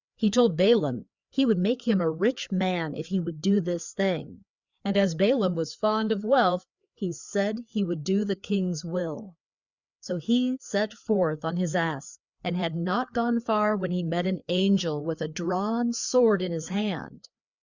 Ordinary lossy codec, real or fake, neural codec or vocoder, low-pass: Opus, 64 kbps; fake; codec, 16 kHz, 4 kbps, FreqCodec, larger model; 7.2 kHz